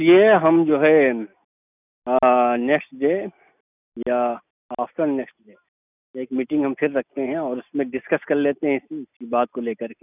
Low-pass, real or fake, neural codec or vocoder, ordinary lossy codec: 3.6 kHz; real; none; none